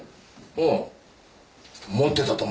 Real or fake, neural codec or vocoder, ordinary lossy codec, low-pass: real; none; none; none